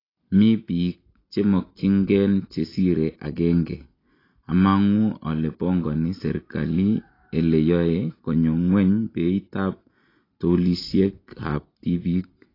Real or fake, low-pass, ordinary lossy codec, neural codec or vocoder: real; 5.4 kHz; AAC, 24 kbps; none